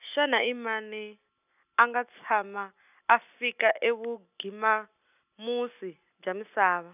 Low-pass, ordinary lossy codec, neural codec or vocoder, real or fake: 3.6 kHz; none; none; real